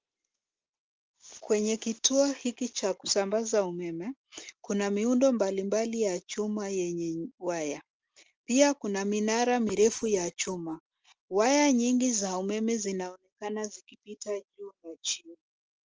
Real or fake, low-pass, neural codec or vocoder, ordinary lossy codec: real; 7.2 kHz; none; Opus, 24 kbps